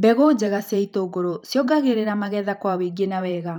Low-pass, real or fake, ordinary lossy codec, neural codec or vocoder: 19.8 kHz; fake; none; vocoder, 44.1 kHz, 128 mel bands every 512 samples, BigVGAN v2